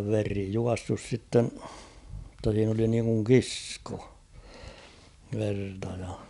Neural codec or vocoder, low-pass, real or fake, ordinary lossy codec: none; 10.8 kHz; real; none